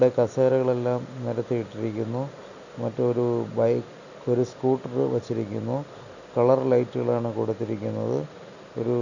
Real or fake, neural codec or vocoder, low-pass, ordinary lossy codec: real; none; 7.2 kHz; none